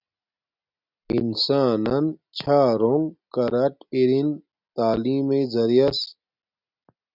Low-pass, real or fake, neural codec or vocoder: 5.4 kHz; real; none